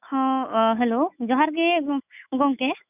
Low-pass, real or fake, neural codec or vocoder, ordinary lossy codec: 3.6 kHz; fake; autoencoder, 48 kHz, 128 numbers a frame, DAC-VAE, trained on Japanese speech; none